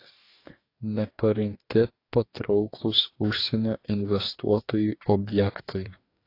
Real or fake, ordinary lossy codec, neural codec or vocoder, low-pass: fake; AAC, 32 kbps; codec, 44.1 kHz, 2.6 kbps, DAC; 5.4 kHz